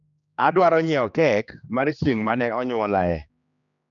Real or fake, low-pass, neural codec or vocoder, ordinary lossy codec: fake; 7.2 kHz; codec, 16 kHz, 2 kbps, X-Codec, HuBERT features, trained on general audio; none